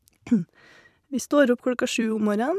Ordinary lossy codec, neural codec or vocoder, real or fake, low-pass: none; vocoder, 44.1 kHz, 128 mel bands every 256 samples, BigVGAN v2; fake; 14.4 kHz